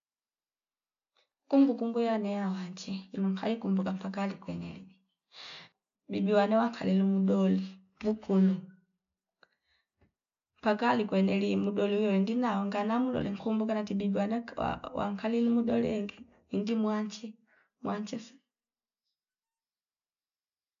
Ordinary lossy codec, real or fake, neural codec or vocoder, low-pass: none; real; none; 7.2 kHz